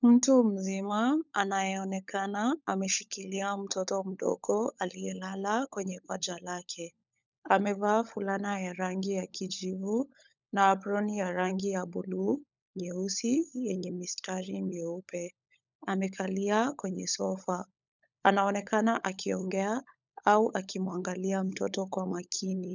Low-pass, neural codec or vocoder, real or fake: 7.2 kHz; codec, 16 kHz, 16 kbps, FunCodec, trained on LibriTTS, 50 frames a second; fake